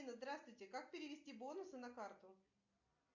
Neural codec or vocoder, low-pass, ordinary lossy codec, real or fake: none; 7.2 kHz; MP3, 48 kbps; real